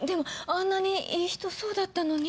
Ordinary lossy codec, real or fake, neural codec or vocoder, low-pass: none; real; none; none